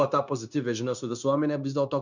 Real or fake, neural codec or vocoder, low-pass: fake; codec, 16 kHz, 0.9 kbps, LongCat-Audio-Codec; 7.2 kHz